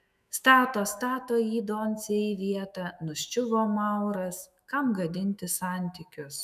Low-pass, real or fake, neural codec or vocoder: 14.4 kHz; fake; autoencoder, 48 kHz, 128 numbers a frame, DAC-VAE, trained on Japanese speech